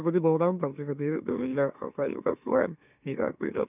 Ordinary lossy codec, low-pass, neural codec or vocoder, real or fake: none; 3.6 kHz; autoencoder, 44.1 kHz, a latent of 192 numbers a frame, MeloTTS; fake